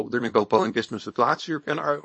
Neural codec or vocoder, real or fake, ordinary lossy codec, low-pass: codec, 24 kHz, 0.9 kbps, WavTokenizer, small release; fake; MP3, 32 kbps; 9.9 kHz